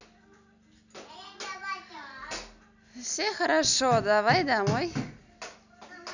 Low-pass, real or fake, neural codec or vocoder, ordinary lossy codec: 7.2 kHz; real; none; none